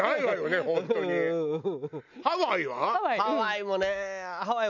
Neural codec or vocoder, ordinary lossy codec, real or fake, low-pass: none; none; real; 7.2 kHz